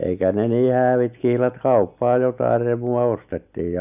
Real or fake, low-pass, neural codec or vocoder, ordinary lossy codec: real; 3.6 kHz; none; none